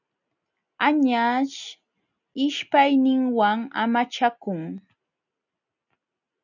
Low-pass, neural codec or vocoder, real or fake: 7.2 kHz; none; real